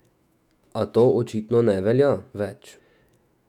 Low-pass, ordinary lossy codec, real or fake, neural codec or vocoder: 19.8 kHz; none; fake; autoencoder, 48 kHz, 128 numbers a frame, DAC-VAE, trained on Japanese speech